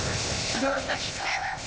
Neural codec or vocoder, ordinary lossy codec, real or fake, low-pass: codec, 16 kHz, 0.8 kbps, ZipCodec; none; fake; none